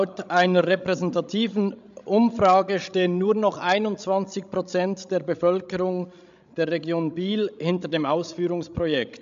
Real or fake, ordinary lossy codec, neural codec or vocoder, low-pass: fake; MP3, 64 kbps; codec, 16 kHz, 16 kbps, FreqCodec, larger model; 7.2 kHz